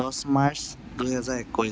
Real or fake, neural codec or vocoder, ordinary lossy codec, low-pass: real; none; none; none